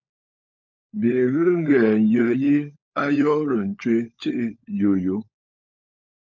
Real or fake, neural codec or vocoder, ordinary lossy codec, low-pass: fake; codec, 16 kHz, 16 kbps, FunCodec, trained on LibriTTS, 50 frames a second; MP3, 64 kbps; 7.2 kHz